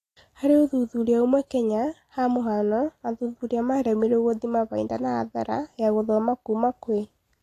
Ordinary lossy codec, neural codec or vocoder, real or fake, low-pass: AAC, 48 kbps; none; real; 14.4 kHz